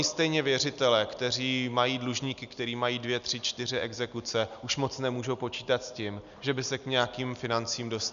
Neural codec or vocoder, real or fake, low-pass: none; real; 7.2 kHz